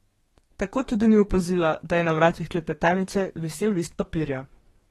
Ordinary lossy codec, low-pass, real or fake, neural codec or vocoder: AAC, 32 kbps; 14.4 kHz; fake; codec, 32 kHz, 1.9 kbps, SNAC